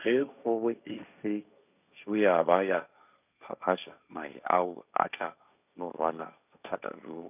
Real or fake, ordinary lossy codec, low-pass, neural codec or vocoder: fake; none; 3.6 kHz; codec, 16 kHz, 1.1 kbps, Voila-Tokenizer